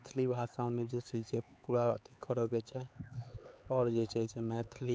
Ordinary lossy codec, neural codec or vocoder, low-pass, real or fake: none; codec, 16 kHz, 4 kbps, X-Codec, HuBERT features, trained on LibriSpeech; none; fake